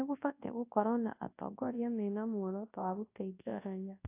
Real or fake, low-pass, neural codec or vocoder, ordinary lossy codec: fake; 3.6 kHz; codec, 24 kHz, 0.9 kbps, WavTokenizer, large speech release; AAC, 24 kbps